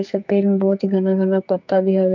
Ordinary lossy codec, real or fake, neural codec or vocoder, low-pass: MP3, 64 kbps; fake; codec, 44.1 kHz, 2.6 kbps, SNAC; 7.2 kHz